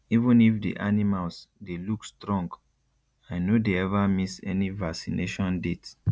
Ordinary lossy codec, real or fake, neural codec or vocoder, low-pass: none; real; none; none